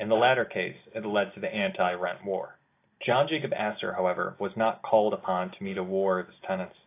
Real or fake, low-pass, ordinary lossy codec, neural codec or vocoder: real; 3.6 kHz; AAC, 24 kbps; none